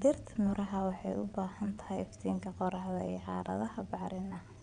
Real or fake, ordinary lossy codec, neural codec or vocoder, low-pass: fake; none; vocoder, 22.05 kHz, 80 mel bands, WaveNeXt; 9.9 kHz